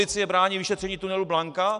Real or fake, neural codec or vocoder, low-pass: real; none; 10.8 kHz